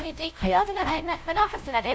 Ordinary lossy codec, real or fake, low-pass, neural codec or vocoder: none; fake; none; codec, 16 kHz, 0.5 kbps, FunCodec, trained on LibriTTS, 25 frames a second